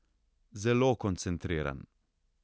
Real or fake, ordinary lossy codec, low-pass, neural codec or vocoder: real; none; none; none